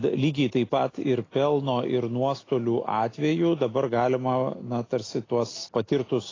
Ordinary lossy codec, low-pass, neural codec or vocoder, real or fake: AAC, 32 kbps; 7.2 kHz; none; real